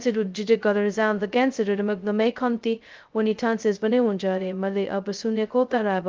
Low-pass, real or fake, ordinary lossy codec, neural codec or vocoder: 7.2 kHz; fake; Opus, 32 kbps; codec, 16 kHz, 0.2 kbps, FocalCodec